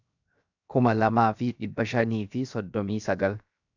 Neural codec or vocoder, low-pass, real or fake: codec, 16 kHz, 0.7 kbps, FocalCodec; 7.2 kHz; fake